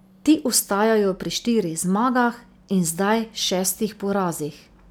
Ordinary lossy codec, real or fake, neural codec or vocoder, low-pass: none; real; none; none